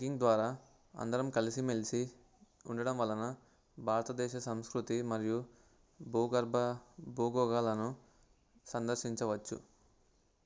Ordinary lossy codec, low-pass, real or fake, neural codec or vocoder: none; none; real; none